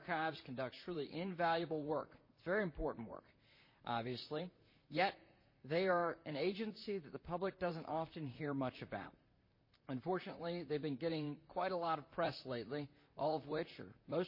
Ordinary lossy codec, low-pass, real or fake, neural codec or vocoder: MP3, 24 kbps; 5.4 kHz; fake; vocoder, 44.1 kHz, 128 mel bands, Pupu-Vocoder